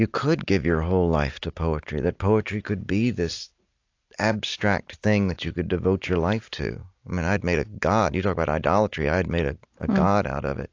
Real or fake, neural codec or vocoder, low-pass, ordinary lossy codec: real; none; 7.2 kHz; AAC, 48 kbps